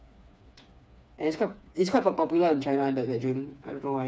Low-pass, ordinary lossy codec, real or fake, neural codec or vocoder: none; none; fake; codec, 16 kHz, 4 kbps, FreqCodec, smaller model